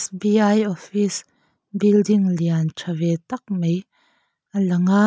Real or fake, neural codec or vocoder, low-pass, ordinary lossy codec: real; none; none; none